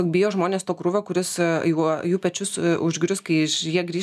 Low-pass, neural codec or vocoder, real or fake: 14.4 kHz; none; real